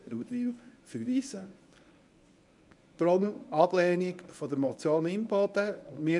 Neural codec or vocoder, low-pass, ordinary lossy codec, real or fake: codec, 24 kHz, 0.9 kbps, WavTokenizer, medium speech release version 1; 10.8 kHz; none; fake